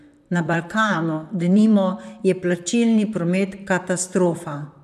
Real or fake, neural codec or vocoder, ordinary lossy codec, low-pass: fake; vocoder, 44.1 kHz, 128 mel bands, Pupu-Vocoder; none; 14.4 kHz